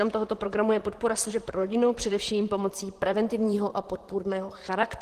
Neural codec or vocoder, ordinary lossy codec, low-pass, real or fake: vocoder, 44.1 kHz, 128 mel bands, Pupu-Vocoder; Opus, 16 kbps; 14.4 kHz; fake